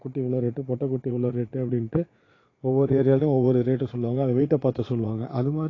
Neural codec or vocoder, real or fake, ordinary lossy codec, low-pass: vocoder, 22.05 kHz, 80 mel bands, Vocos; fake; AAC, 32 kbps; 7.2 kHz